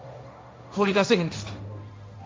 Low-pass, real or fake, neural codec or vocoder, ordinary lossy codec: none; fake; codec, 16 kHz, 1.1 kbps, Voila-Tokenizer; none